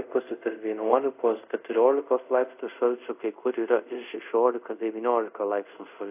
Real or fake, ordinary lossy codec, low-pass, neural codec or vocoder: fake; AAC, 32 kbps; 3.6 kHz; codec, 24 kHz, 0.5 kbps, DualCodec